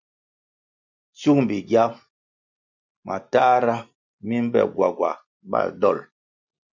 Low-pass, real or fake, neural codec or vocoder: 7.2 kHz; real; none